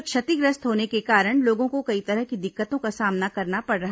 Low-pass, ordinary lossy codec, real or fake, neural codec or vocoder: none; none; real; none